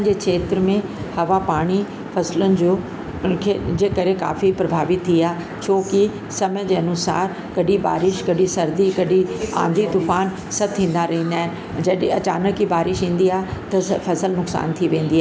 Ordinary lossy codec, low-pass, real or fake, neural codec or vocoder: none; none; real; none